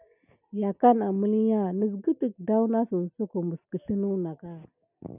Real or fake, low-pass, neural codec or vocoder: real; 3.6 kHz; none